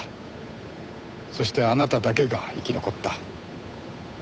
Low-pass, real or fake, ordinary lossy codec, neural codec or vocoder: none; fake; none; codec, 16 kHz, 8 kbps, FunCodec, trained on Chinese and English, 25 frames a second